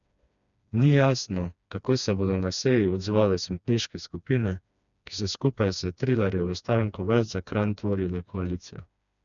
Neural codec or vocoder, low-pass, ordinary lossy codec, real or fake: codec, 16 kHz, 2 kbps, FreqCodec, smaller model; 7.2 kHz; none; fake